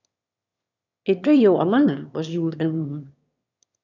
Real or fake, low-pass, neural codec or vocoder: fake; 7.2 kHz; autoencoder, 22.05 kHz, a latent of 192 numbers a frame, VITS, trained on one speaker